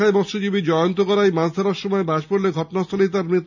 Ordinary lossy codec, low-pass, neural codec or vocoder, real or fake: none; 7.2 kHz; none; real